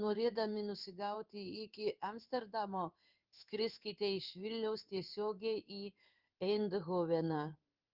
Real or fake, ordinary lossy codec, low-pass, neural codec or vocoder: fake; Opus, 24 kbps; 5.4 kHz; codec, 16 kHz in and 24 kHz out, 1 kbps, XY-Tokenizer